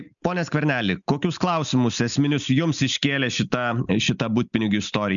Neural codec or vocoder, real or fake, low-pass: none; real; 7.2 kHz